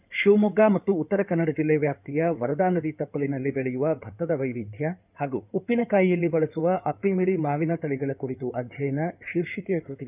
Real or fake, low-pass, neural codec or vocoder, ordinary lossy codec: fake; 3.6 kHz; codec, 16 kHz in and 24 kHz out, 2.2 kbps, FireRedTTS-2 codec; none